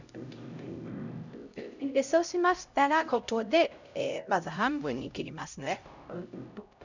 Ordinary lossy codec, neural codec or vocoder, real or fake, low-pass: none; codec, 16 kHz, 0.5 kbps, X-Codec, HuBERT features, trained on LibriSpeech; fake; 7.2 kHz